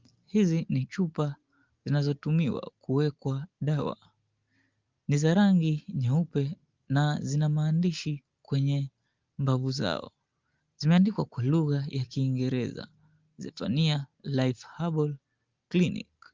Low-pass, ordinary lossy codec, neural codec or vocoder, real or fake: 7.2 kHz; Opus, 32 kbps; none; real